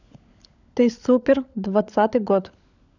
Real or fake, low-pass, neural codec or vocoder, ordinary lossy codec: fake; 7.2 kHz; codec, 16 kHz, 16 kbps, FunCodec, trained on LibriTTS, 50 frames a second; none